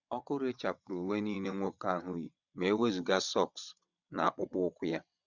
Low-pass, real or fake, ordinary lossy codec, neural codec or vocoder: 7.2 kHz; fake; none; vocoder, 22.05 kHz, 80 mel bands, WaveNeXt